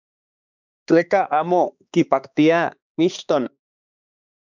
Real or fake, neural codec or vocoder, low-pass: fake; codec, 16 kHz, 2 kbps, X-Codec, HuBERT features, trained on balanced general audio; 7.2 kHz